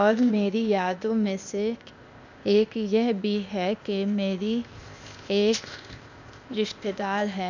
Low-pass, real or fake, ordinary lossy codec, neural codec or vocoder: 7.2 kHz; fake; none; codec, 16 kHz, 0.8 kbps, ZipCodec